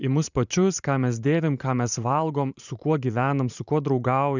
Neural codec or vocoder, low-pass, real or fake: vocoder, 44.1 kHz, 128 mel bands every 512 samples, BigVGAN v2; 7.2 kHz; fake